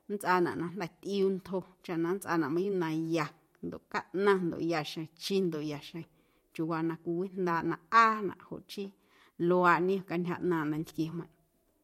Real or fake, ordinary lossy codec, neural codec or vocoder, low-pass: fake; MP3, 64 kbps; vocoder, 44.1 kHz, 128 mel bands every 512 samples, BigVGAN v2; 19.8 kHz